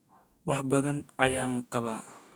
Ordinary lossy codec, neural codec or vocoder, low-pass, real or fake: none; codec, 44.1 kHz, 2.6 kbps, DAC; none; fake